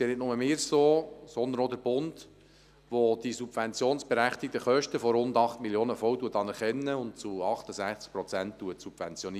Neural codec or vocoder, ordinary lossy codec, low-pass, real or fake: none; none; 14.4 kHz; real